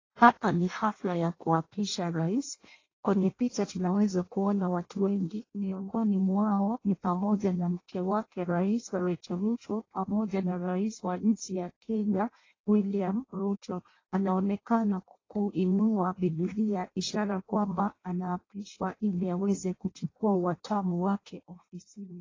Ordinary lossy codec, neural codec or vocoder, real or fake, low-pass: AAC, 32 kbps; codec, 16 kHz in and 24 kHz out, 0.6 kbps, FireRedTTS-2 codec; fake; 7.2 kHz